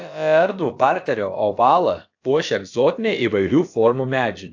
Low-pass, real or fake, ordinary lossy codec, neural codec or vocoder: 7.2 kHz; fake; AAC, 48 kbps; codec, 16 kHz, about 1 kbps, DyCAST, with the encoder's durations